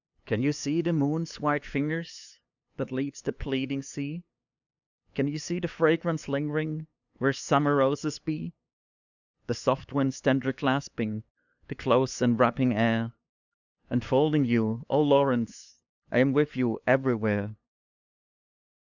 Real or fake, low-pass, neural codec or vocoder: fake; 7.2 kHz; codec, 16 kHz, 2 kbps, FunCodec, trained on LibriTTS, 25 frames a second